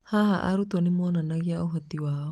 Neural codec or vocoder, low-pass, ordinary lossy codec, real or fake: none; 14.4 kHz; Opus, 24 kbps; real